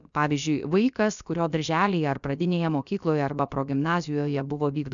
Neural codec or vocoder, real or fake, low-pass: codec, 16 kHz, about 1 kbps, DyCAST, with the encoder's durations; fake; 7.2 kHz